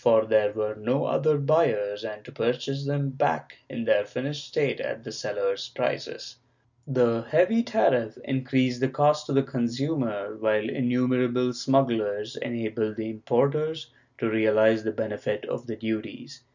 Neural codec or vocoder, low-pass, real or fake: none; 7.2 kHz; real